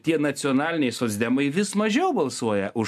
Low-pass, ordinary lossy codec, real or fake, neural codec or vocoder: 14.4 kHz; MP3, 96 kbps; real; none